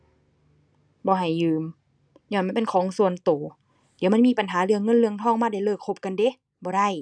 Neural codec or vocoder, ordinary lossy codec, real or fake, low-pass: none; none; real; 9.9 kHz